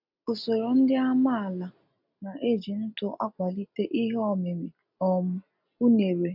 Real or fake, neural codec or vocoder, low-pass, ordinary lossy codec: real; none; 5.4 kHz; none